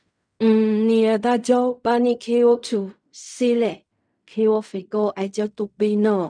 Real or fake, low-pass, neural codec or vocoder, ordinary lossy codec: fake; 9.9 kHz; codec, 16 kHz in and 24 kHz out, 0.4 kbps, LongCat-Audio-Codec, fine tuned four codebook decoder; none